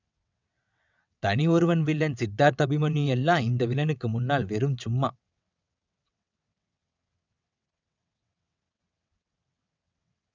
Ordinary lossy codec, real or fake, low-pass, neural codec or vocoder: none; fake; 7.2 kHz; vocoder, 22.05 kHz, 80 mel bands, WaveNeXt